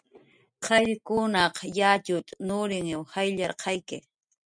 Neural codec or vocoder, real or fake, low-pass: none; real; 9.9 kHz